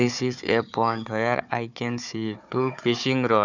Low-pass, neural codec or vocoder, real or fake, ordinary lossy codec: 7.2 kHz; codec, 16 kHz, 4 kbps, FunCodec, trained on Chinese and English, 50 frames a second; fake; none